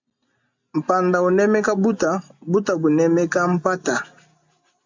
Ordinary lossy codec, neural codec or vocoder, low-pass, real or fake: MP3, 64 kbps; none; 7.2 kHz; real